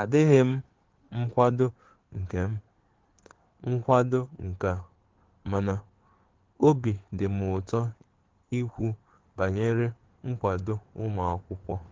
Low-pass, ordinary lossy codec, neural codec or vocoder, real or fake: 7.2 kHz; Opus, 16 kbps; codec, 16 kHz in and 24 kHz out, 2.2 kbps, FireRedTTS-2 codec; fake